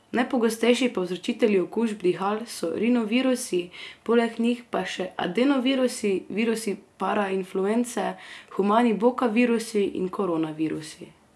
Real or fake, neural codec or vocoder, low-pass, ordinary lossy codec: real; none; none; none